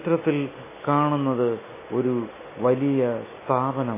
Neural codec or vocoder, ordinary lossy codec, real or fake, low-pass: none; MP3, 16 kbps; real; 3.6 kHz